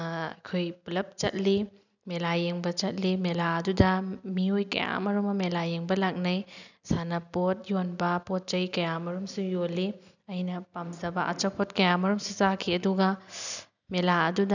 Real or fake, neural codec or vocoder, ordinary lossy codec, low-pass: real; none; none; 7.2 kHz